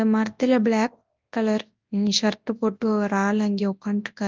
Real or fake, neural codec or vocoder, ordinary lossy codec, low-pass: fake; codec, 24 kHz, 0.9 kbps, WavTokenizer, large speech release; Opus, 24 kbps; 7.2 kHz